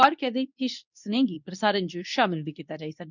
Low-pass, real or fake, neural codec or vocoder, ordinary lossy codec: 7.2 kHz; fake; codec, 24 kHz, 0.9 kbps, WavTokenizer, medium speech release version 2; none